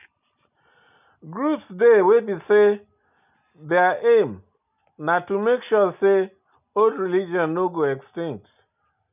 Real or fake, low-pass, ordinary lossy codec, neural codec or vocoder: real; 3.6 kHz; none; none